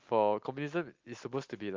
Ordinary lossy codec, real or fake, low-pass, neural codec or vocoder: Opus, 32 kbps; real; 7.2 kHz; none